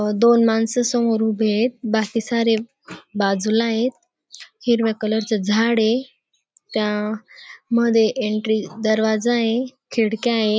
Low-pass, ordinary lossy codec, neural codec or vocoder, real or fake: none; none; none; real